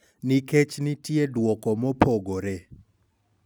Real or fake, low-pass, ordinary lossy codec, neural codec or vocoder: real; none; none; none